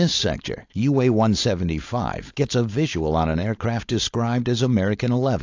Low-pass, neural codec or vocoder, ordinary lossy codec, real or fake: 7.2 kHz; codec, 16 kHz, 4.8 kbps, FACodec; AAC, 48 kbps; fake